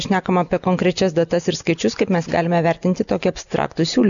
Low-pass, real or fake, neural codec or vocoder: 7.2 kHz; real; none